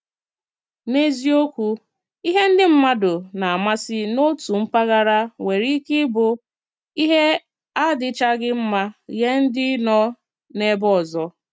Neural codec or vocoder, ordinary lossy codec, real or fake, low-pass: none; none; real; none